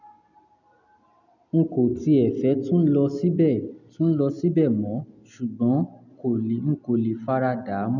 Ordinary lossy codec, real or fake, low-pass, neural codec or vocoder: none; real; 7.2 kHz; none